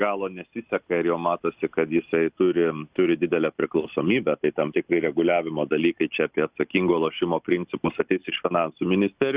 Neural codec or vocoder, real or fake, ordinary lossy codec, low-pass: none; real; Opus, 64 kbps; 3.6 kHz